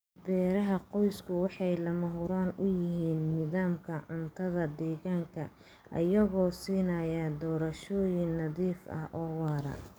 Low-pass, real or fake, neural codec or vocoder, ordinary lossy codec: none; fake; codec, 44.1 kHz, 7.8 kbps, DAC; none